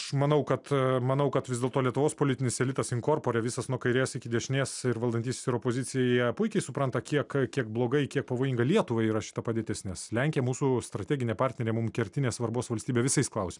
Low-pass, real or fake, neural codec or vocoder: 10.8 kHz; real; none